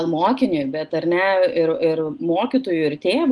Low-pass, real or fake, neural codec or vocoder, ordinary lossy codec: 10.8 kHz; real; none; Opus, 24 kbps